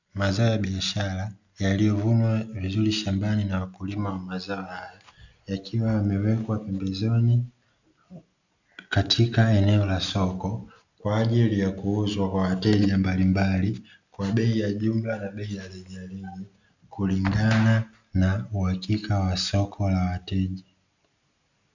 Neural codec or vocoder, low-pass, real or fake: none; 7.2 kHz; real